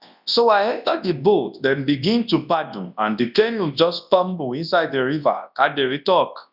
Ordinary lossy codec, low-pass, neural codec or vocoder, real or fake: none; 5.4 kHz; codec, 24 kHz, 0.9 kbps, WavTokenizer, large speech release; fake